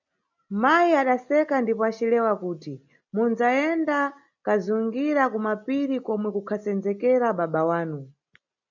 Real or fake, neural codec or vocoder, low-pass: real; none; 7.2 kHz